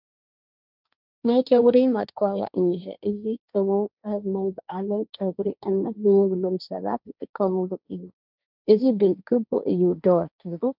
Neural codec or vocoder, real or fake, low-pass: codec, 16 kHz, 1.1 kbps, Voila-Tokenizer; fake; 5.4 kHz